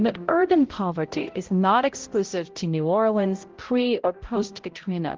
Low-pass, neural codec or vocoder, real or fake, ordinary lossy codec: 7.2 kHz; codec, 16 kHz, 0.5 kbps, X-Codec, HuBERT features, trained on balanced general audio; fake; Opus, 16 kbps